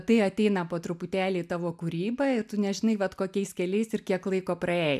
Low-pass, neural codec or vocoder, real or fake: 14.4 kHz; none; real